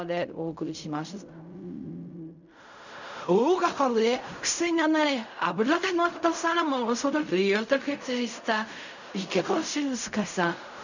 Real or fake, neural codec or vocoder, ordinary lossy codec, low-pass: fake; codec, 16 kHz in and 24 kHz out, 0.4 kbps, LongCat-Audio-Codec, fine tuned four codebook decoder; none; 7.2 kHz